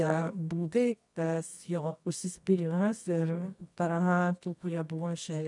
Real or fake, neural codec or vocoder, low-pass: fake; codec, 24 kHz, 0.9 kbps, WavTokenizer, medium music audio release; 10.8 kHz